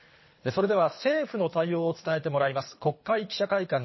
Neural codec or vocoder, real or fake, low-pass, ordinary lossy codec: codec, 24 kHz, 3 kbps, HILCodec; fake; 7.2 kHz; MP3, 24 kbps